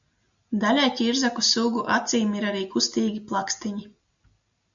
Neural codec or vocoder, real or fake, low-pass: none; real; 7.2 kHz